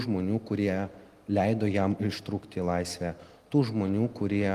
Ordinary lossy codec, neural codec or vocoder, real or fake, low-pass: Opus, 24 kbps; none; real; 14.4 kHz